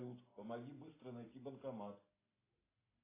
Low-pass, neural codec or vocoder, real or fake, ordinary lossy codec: 3.6 kHz; none; real; AAC, 16 kbps